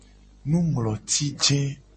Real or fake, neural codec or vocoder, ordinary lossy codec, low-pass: real; none; MP3, 32 kbps; 10.8 kHz